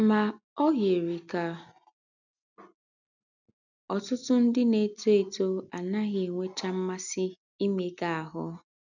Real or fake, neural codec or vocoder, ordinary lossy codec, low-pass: real; none; none; 7.2 kHz